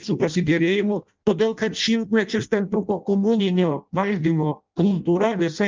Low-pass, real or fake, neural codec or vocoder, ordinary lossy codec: 7.2 kHz; fake; codec, 16 kHz in and 24 kHz out, 0.6 kbps, FireRedTTS-2 codec; Opus, 32 kbps